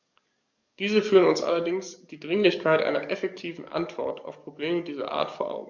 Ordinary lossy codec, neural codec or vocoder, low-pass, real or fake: none; codec, 44.1 kHz, 7.8 kbps, DAC; 7.2 kHz; fake